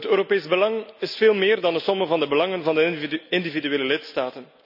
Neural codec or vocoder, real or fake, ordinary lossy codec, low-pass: none; real; none; 5.4 kHz